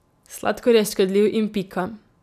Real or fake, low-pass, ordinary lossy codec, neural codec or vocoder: real; 14.4 kHz; none; none